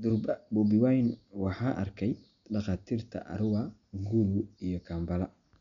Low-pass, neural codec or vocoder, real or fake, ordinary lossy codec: 7.2 kHz; none; real; none